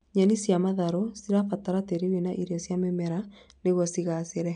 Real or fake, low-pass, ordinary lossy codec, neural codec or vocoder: real; 9.9 kHz; none; none